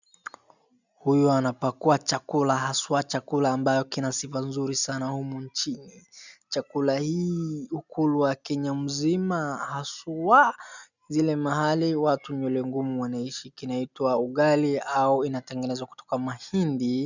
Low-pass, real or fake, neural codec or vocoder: 7.2 kHz; real; none